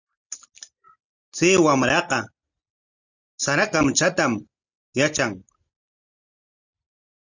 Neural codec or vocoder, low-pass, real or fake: none; 7.2 kHz; real